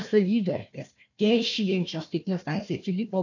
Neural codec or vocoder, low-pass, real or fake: codec, 16 kHz, 1 kbps, FunCodec, trained on Chinese and English, 50 frames a second; 7.2 kHz; fake